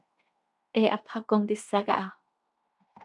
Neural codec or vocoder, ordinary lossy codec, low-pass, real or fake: codec, 16 kHz in and 24 kHz out, 0.9 kbps, LongCat-Audio-Codec, fine tuned four codebook decoder; MP3, 96 kbps; 10.8 kHz; fake